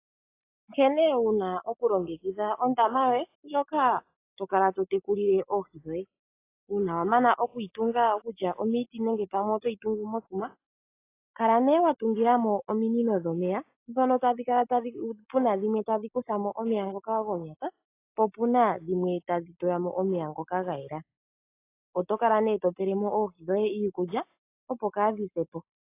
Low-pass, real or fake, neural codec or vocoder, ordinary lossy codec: 3.6 kHz; real; none; AAC, 24 kbps